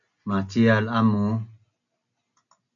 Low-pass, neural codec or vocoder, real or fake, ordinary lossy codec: 7.2 kHz; none; real; MP3, 96 kbps